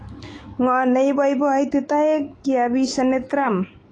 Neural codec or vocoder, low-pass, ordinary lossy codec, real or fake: autoencoder, 48 kHz, 128 numbers a frame, DAC-VAE, trained on Japanese speech; 10.8 kHz; AAC, 32 kbps; fake